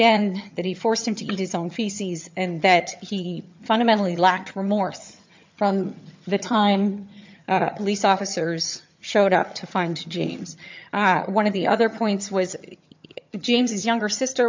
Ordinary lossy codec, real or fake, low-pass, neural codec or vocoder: MP3, 64 kbps; fake; 7.2 kHz; vocoder, 22.05 kHz, 80 mel bands, HiFi-GAN